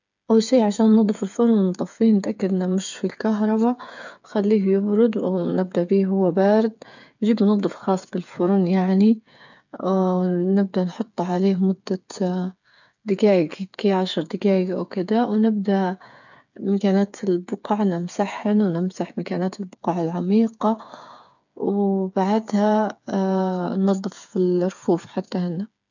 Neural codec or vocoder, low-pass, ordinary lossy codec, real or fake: codec, 16 kHz, 8 kbps, FreqCodec, smaller model; 7.2 kHz; none; fake